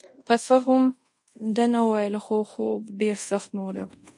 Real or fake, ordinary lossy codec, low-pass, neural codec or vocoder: fake; MP3, 48 kbps; 10.8 kHz; codec, 24 kHz, 0.5 kbps, DualCodec